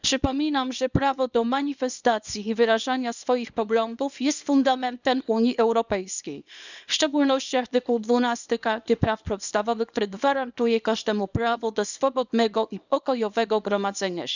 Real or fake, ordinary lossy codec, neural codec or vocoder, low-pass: fake; none; codec, 24 kHz, 0.9 kbps, WavTokenizer, small release; 7.2 kHz